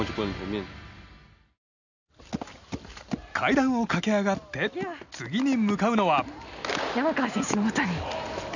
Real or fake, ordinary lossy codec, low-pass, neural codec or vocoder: real; none; 7.2 kHz; none